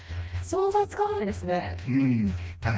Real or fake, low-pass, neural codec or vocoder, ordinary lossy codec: fake; none; codec, 16 kHz, 1 kbps, FreqCodec, smaller model; none